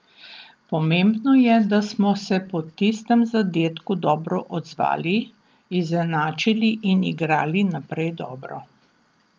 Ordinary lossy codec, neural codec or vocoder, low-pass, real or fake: Opus, 24 kbps; none; 7.2 kHz; real